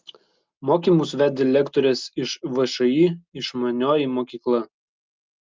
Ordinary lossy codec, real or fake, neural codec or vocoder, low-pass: Opus, 24 kbps; real; none; 7.2 kHz